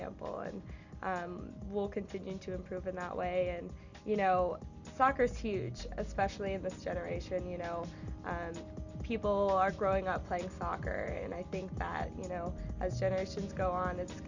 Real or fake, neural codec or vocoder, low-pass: real; none; 7.2 kHz